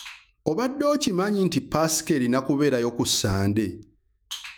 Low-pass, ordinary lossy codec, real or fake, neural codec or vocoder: none; none; fake; autoencoder, 48 kHz, 128 numbers a frame, DAC-VAE, trained on Japanese speech